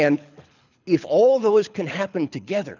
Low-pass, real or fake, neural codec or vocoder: 7.2 kHz; fake; codec, 24 kHz, 6 kbps, HILCodec